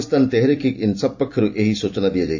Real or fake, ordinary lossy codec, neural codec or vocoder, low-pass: fake; AAC, 48 kbps; vocoder, 44.1 kHz, 128 mel bands every 256 samples, BigVGAN v2; 7.2 kHz